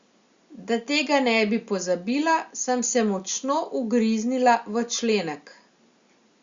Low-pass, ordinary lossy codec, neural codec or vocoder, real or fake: 7.2 kHz; Opus, 64 kbps; none; real